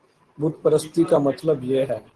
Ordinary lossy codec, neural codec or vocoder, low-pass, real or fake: Opus, 16 kbps; none; 10.8 kHz; real